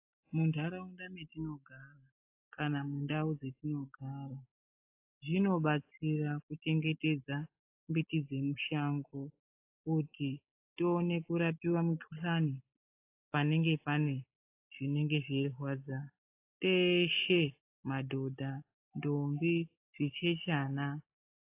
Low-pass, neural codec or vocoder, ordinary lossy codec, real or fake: 3.6 kHz; none; AAC, 24 kbps; real